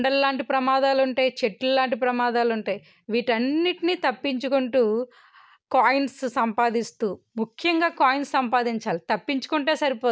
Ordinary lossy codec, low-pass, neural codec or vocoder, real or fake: none; none; none; real